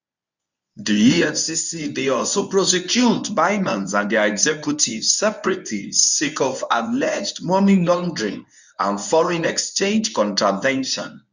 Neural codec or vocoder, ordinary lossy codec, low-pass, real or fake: codec, 24 kHz, 0.9 kbps, WavTokenizer, medium speech release version 1; none; 7.2 kHz; fake